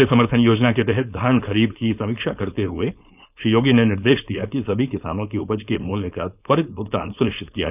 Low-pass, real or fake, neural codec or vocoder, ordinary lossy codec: 3.6 kHz; fake; codec, 16 kHz, 4.8 kbps, FACodec; none